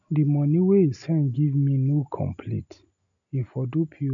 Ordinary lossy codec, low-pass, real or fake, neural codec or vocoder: none; 7.2 kHz; real; none